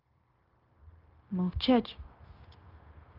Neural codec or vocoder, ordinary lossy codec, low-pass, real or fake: codec, 16 kHz, 0.9 kbps, LongCat-Audio-Codec; Opus, 24 kbps; 5.4 kHz; fake